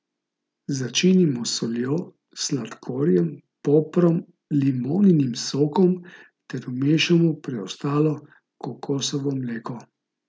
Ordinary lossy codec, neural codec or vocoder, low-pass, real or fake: none; none; none; real